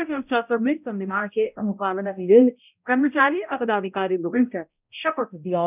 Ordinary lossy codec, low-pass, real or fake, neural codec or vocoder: none; 3.6 kHz; fake; codec, 16 kHz, 0.5 kbps, X-Codec, HuBERT features, trained on balanced general audio